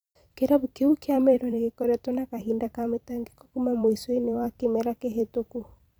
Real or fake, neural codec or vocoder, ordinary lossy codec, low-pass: fake; vocoder, 44.1 kHz, 128 mel bands every 256 samples, BigVGAN v2; none; none